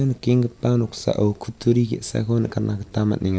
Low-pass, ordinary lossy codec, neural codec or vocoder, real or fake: none; none; none; real